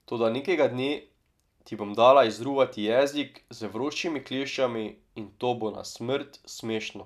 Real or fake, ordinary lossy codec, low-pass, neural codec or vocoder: real; none; 14.4 kHz; none